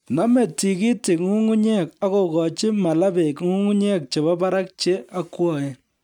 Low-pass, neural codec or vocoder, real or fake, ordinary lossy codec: 19.8 kHz; none; real; none